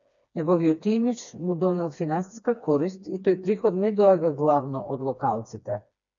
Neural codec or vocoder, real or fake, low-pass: codec, 16 kHz, 2 kbps, FreqCodec, smaller model; fake; 7.2 kHz